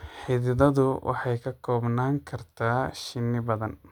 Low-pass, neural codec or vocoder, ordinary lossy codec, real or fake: 19.8 kHz; none; none; real